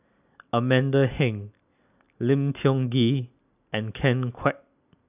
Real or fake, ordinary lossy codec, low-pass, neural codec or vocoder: real; none; 3.6 kHz; none